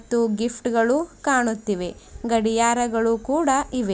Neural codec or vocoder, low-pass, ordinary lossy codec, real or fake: none; none; none; real